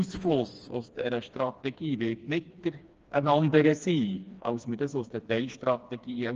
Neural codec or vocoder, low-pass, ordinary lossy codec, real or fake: codec, 16 kHz, 2 kbps, FreqCodec, smaller model; 7.2 kHz; Opus, 24 kbps; fake